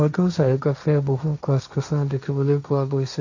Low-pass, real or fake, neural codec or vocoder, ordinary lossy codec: 7.2 kHz; fake; codec, 16 kHz, 1.1 kbps, Voila-Tokenizer; none